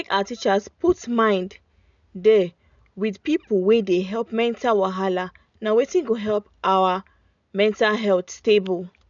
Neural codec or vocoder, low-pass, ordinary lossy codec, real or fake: none; 7.2 kHz; none; real